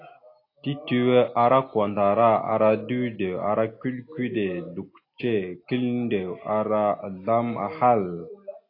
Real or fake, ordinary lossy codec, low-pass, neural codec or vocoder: real; AAC, 32 kbps; 5.4 kHz; none